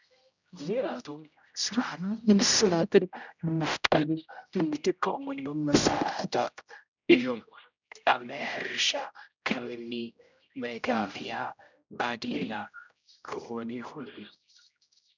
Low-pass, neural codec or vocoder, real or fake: 7.2 kHz; codec, 16 kHz, 0.5 kbps, X-Codec, HuBERT features, trained on general audio; fake